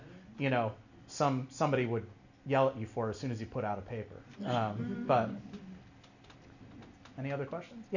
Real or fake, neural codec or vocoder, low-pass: real; none; 7.2 kHz